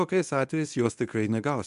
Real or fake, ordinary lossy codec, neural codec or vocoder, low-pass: fake; MP3, 96 kbps; codec, 24 kHz, 0.9 kbps, WavTokenizer, medium speech release version 1; 10.8 kHz